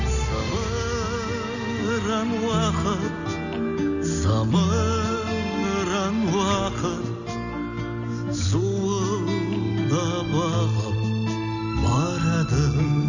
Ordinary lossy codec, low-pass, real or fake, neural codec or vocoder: none; 7.2 kHz; real; none